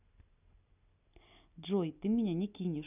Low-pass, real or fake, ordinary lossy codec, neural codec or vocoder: 3.6 kHz; real; none; none